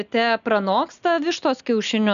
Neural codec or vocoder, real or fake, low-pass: none; real; 7.2 kHz